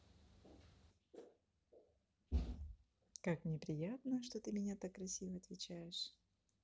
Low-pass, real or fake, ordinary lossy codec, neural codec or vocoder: none; real; none; none